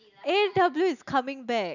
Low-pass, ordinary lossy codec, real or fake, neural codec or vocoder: 7.2 kHz; none; real; none